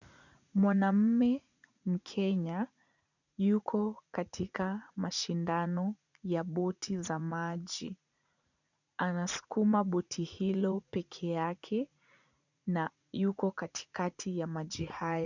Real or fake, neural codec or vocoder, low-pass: fake; vocoder, 44.1 kHz, 80 mel bands, Vocos; 7.2 kHz